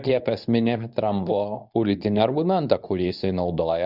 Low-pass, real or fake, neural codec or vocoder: 5.4 kHz; fake; codec, 24 kHz, 0.9 kbps, WavTokenizer, medium speech release version 2